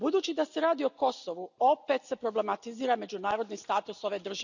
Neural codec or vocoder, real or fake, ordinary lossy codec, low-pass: none; real; none; 7.2 kHz